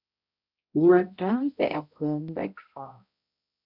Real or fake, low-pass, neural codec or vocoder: fake; 5.4 kHz; codec, 16 kHz, 0.5 kbps, X-Codec, HuBERT features, trained on balanced general audio